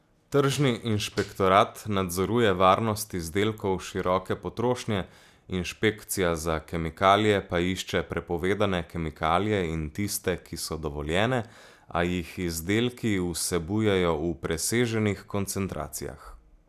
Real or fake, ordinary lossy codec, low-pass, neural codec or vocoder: real; none; 14.4 kHz; none